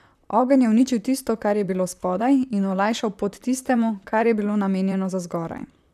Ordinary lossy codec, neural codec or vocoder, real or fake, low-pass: none; vocoder, 44.1 kHz, 128 mel bands, Pupu-Vocoder; fake; 14.4 kHz